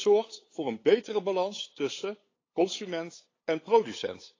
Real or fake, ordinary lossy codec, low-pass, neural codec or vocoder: fake; AAC, 32 kbps; 7.2 kHz; codec, 16 kHz, 8 kbps, FunCodec, trained on LibriTTS, 25 frames a second